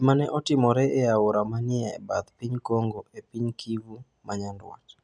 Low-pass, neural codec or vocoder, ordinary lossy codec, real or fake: 9.9 kHz; none; none; real